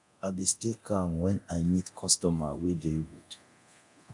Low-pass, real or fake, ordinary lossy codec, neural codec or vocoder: 10.8 kHz; fake; none; codec, 24 kHz, 0.9 kbps, DualCodec